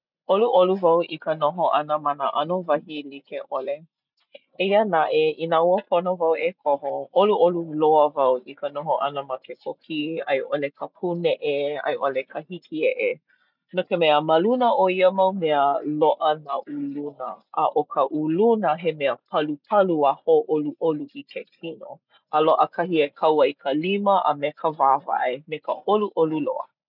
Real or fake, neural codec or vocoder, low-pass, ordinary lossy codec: real; none; 5.4 kHz; none